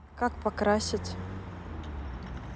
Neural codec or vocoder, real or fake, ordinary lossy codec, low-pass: none; real; none; none